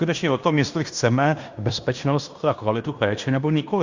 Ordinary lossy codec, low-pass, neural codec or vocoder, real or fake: Opus, 64 kbps; 7.2 kHz; codec, 16 kHz in and 24 kHz out, 0.9 kbps, LongCat-Audio-Codec, fine tuned four codebook decoder; fake